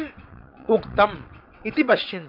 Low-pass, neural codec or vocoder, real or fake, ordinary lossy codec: 5.4 kHz; vocoder, 22.05 kHz, 80 mel bands, WaveNeXt; fake; none